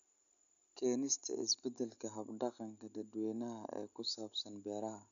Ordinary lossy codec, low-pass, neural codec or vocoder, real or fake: none; 7.2 kHz; none; real